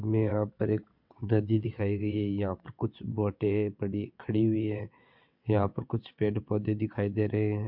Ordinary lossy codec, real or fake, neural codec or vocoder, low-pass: MP3, 48 kbps; fake; vocoder, 22.05 kHz, 80 mel bands, WaveNeXt; 5.4 kHz